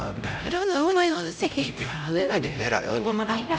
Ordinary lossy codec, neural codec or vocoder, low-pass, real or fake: none; codec, 16 kHz, 0.5 kbps, X-Codec, HuBERT features, trained on LibriSpeech; none; fake